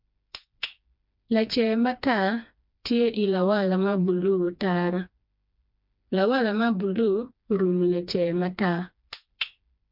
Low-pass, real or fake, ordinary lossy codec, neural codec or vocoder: 5.4 kHz; fake; MP3, 48 kbps; codec, 16 kHz, 2 kbps, FreqCodec, smaller model